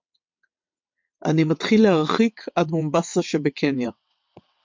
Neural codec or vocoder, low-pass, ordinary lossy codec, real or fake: vocoder, 22.05 kHz, 80 mel bands, WaveNeXt; 7.2 kHz; MP3, 64 kbps; fake